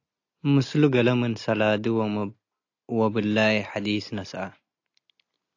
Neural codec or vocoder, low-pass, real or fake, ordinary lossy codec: none; 7.2 kHz; real; AAC, 48 kbps